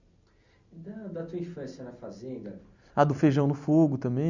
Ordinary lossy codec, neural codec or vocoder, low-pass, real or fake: Opus, 64 kbps; none; 7.2 kHz; real